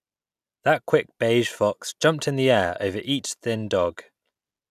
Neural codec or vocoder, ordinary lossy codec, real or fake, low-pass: none; none; real; 14.4 kHz